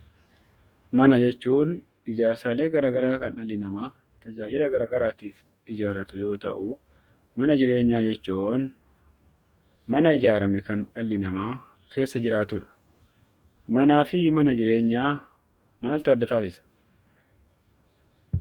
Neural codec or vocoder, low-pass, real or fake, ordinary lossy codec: codec, 44.1 kHz, 2.6 kbps, DAC; 19.8 kHz; fake; Opus, 64 kbps